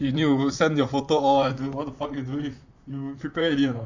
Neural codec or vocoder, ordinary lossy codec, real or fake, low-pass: vocoder, 44.1 kHz, 128 mel bands, Pupu-Vocoder; Opus, 64 kbps; fake; 7.2 kHz